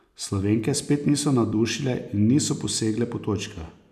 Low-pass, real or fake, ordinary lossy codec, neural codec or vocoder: 14.4 kHz; real; none; none